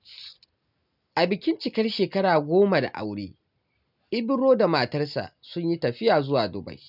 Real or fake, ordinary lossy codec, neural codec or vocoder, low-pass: real; none; none; 5.4 kHz